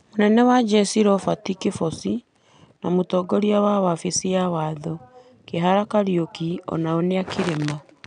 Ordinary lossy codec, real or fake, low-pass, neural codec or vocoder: none; real; 9.9 kHz; none